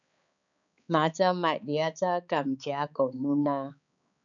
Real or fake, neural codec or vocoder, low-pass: fake; codec, 16 kHz, 4 kbps, X-Codec, HuBERT features, trained on balanced general audio; 7.2 kHz